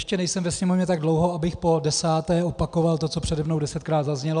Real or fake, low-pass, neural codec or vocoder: real; 9.9 kHz; none